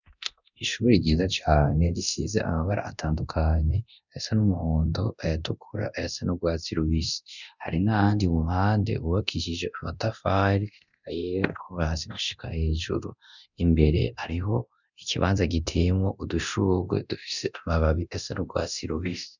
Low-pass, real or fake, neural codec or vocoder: 7.2 kHz; fake; codec, 24 kHz, 0.9 kbps, DualCodec